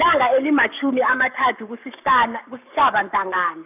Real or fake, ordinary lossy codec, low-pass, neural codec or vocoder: fake; none; 3.6 kHz; codec, 44.1 kHz, 7.8 kbps, Pupu-Codec